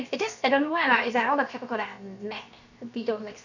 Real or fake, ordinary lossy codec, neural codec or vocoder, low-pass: fake; none; codec, 16 kHz, 0.7 kbps, FocalCodec; 7.2 kHz